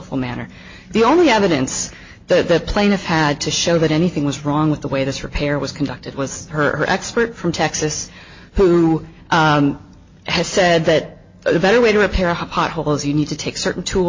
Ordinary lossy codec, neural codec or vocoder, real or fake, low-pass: MP3, 32 kbps; none; real; 7.2 kHz